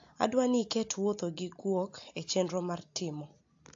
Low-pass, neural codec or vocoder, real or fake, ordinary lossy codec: 7.2 kHz; none; real; AAC, 48 kbps